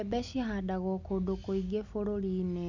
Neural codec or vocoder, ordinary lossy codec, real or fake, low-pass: none; none; real; 7.2 kHz